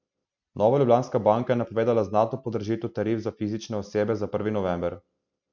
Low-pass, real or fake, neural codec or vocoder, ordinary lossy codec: 7.2 kHz; real; none; none